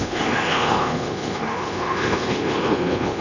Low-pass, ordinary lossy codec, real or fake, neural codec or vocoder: 7.2 kHz; none; fake; codec, 24 kHz, 1.2 kbps, DualCodec